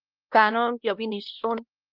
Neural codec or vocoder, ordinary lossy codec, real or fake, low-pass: codec, 16 kHz, 1 kbps, X-Codec, WavLM features, trained on Multilingual LibriSpeech; Opus, 24 kbps; fake; 5.4 kHz